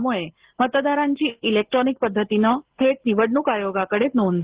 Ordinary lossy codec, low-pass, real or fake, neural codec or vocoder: Opus, 16 kbps; 3.6 kHz; real; none